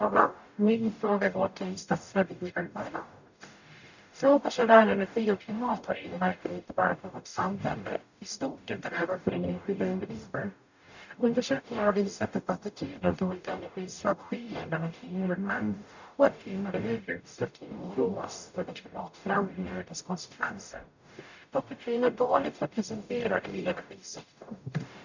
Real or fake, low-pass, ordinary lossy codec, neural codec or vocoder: fake; 7.2 kHz; none; codec, 44.1 kHz, 0.9 kbps, DAC